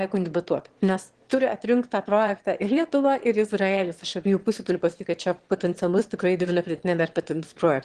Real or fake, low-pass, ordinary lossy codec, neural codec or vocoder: fake; 9.9 kHz; Opus, 16 kbps; autoencoder, 22.05 kHz, a latent of 192 numbers a frame, VITS, trained on one speaker